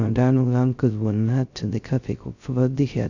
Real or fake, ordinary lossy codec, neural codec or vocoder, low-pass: fake; none; codec, 16 kHz, 0.2 kbps, FocalCodec; 7.2 kHz